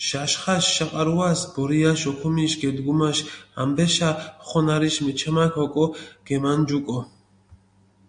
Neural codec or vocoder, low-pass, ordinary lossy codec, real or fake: none; 9.9 kHz; MP3, 48 kbps; real